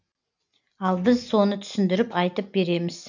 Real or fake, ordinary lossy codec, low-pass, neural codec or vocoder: real; none; 7.2 kHz; none